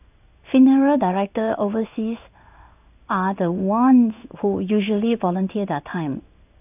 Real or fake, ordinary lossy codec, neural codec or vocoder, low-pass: fake; none; codec, 16 kHz in and 24 kHz out, 1 kbps, XY-Tokenizer; 3.6 kHz